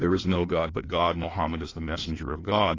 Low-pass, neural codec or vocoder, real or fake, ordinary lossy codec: 7.2 kHz; codec, 16 kHz in and 24 kHz out, 1.1 kbps, FireRedTTS-2 codec; fake; AAC, 32 kbps